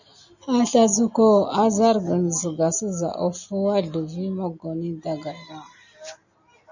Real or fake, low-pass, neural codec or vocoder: real; 7.2 kHz; none